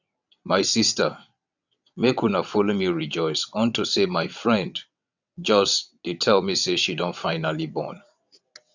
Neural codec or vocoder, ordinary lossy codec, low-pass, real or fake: vocoder, 22.05 kHz, 80 mel bands, WaveNeXt; none; 7.2 kHz; fake